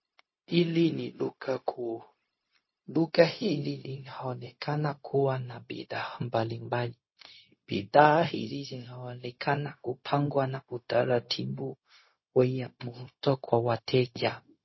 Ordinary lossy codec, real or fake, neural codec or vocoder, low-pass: MP3, 24 kbps; fake; codec, 16 kHz, 0.4 kbps, LongCat-Audio-Codec; 7.2 kHz